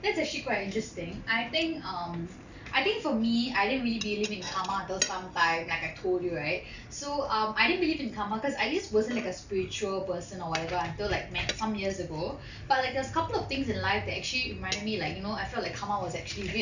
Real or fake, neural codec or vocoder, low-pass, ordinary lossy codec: real; none; 7.2 kHz; none